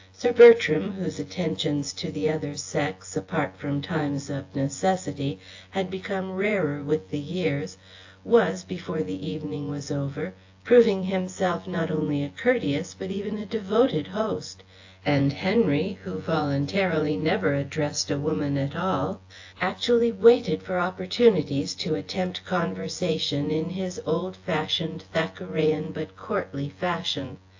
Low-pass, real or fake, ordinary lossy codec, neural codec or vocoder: 7.2 kHz; fake; AAC, 48 kbps; vocoder, 24 kHz, 100 mel bands, Vocos